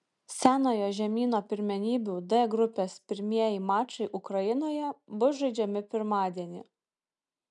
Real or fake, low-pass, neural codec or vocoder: real; 10.8 kHz; none